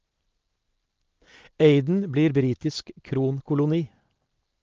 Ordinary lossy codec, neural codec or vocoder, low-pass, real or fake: Opus, 16 kbps; none; 7.2 kHz; real